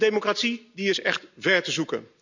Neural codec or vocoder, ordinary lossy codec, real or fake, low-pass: none; none; real; 7.2 kHz